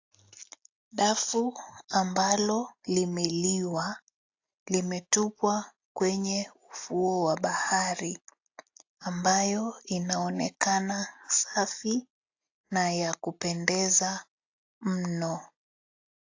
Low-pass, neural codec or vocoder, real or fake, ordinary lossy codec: 7.2 kHz; none; real; AAC, 48 kbps